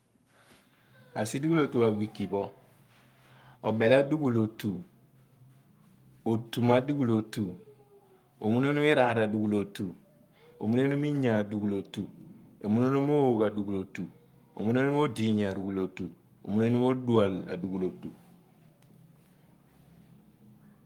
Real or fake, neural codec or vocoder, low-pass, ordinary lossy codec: fake; codec, 44.1 kHz, 7.8 kbps, Pupu-Codec; 19.8 kHz; Opus, 32 kbps